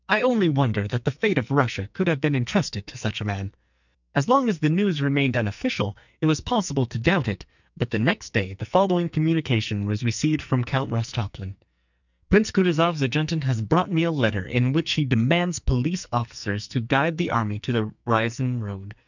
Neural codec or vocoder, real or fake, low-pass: codec, 44.1 kHz, 2.6 kbps, SNAC; fake; 7.2 kHz